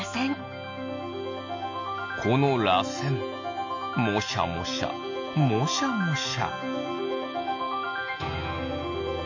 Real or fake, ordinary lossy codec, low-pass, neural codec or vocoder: real; MP3, 48 kbps; 7.2 kHz; none